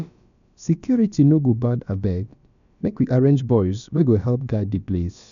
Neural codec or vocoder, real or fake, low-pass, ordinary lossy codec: codec, 16 kHz, about 1 kbps, DyCAST, with the encoder's durations; fake; 7.2 kHz; none